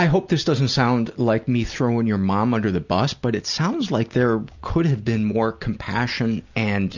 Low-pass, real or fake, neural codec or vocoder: 7.2 kHz; real; none